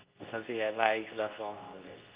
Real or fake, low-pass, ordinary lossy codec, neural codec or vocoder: fake; 3.6 kHz; Opus, 24 kbps; codec, 24 kHz, 0.9 kbps, WavTokenizer, medium speech release version 2